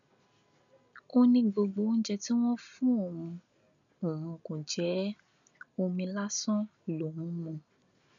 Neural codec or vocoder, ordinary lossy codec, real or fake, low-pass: none; none; real; 7.2 kHz